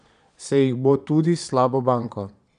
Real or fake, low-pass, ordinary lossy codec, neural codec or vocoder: fake; 9.9 kHz; none; vocoder, 22.05 kHz, 80 mel bands, Vocos